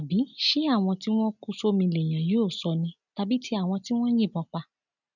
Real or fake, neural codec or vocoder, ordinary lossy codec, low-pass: real; none; none; 7.2 kHz